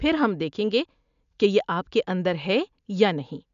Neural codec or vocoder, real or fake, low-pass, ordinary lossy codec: none; real; 7.2 kHz; none